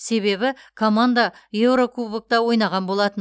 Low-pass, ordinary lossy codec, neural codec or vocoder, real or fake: none; none; none; real